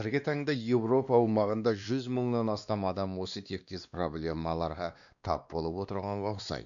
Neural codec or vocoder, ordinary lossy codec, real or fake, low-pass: codec, 16 kHz, 2 kbps, X-Codec, WavLM features, trained on Multilingual LibriSpeech; none; fake; 7.2 kHz